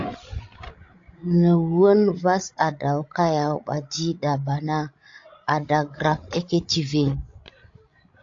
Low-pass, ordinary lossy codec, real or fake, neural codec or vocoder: 7.2 kHz; AAC, 48 kbps; fake; codec, 16 kHz, 16 kbps, FreqCodec, larger model